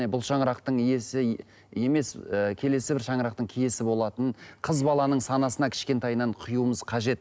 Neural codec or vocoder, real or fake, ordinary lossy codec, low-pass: none; real; none; none